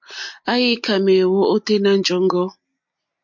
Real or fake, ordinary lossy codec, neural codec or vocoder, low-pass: real; MP3, 48 kbps; none; 7.2 kHz